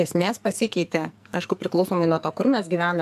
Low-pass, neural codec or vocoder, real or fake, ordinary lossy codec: 14.4 kHz; codec, 44.1 kHz, 2.6 kbps, SNAC; fake; AAC, 96 kbps